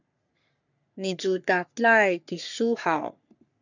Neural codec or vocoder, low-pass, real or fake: codec, 44.1 kHz, 3.4 kbps, Pupu-Codec; 7.2 kHz; fake